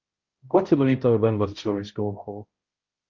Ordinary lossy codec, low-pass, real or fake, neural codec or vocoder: Opus, 32 kbps; 7.2 kHz; fake; codec, 16 kHz, 0.5 kbps, X-Codec, HuBERT features, trained on balanced general audio